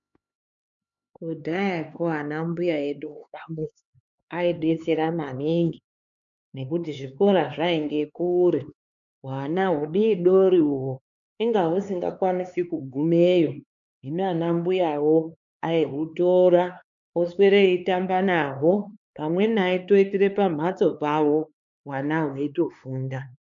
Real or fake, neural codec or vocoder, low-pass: fake; codec, 16 kHz, 4 kbps, X-Codec, HuBERT features, trained on LibriSpeech; 7.2 kHz